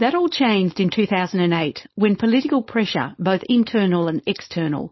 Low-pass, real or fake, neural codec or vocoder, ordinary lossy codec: 7.2 kHz; fake; codec, 16 kHz, 4.8 kbps, FACodec; MP3, 24 kbps